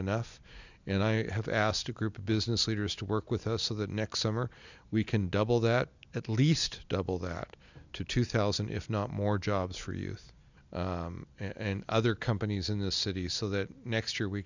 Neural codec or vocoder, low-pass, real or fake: none; 7.2 kHz; real